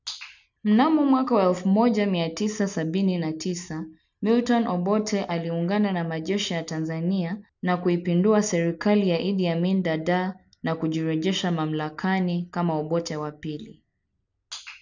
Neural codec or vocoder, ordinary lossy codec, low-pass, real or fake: none; none; 7.2 kHz; real